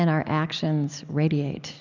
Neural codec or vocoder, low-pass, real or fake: none; 7.2 kHz; real